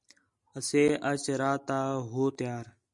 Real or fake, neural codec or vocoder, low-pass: real; none; 10.8 kHz